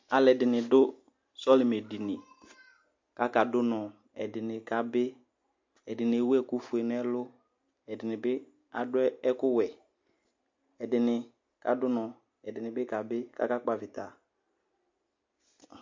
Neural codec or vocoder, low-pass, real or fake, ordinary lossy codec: none; 7.2 kHz; real; MP3, 48 kbps